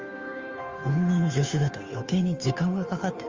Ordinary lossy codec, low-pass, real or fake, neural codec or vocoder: Opus, 32 kbps; 7.2 kHz; fake; codec, 16 kHz, 2 kbps, FunCodec, trained on Chinese and English, 25 frames a second